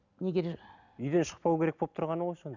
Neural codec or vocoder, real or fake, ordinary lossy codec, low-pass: none; real; none; 7.2 kHz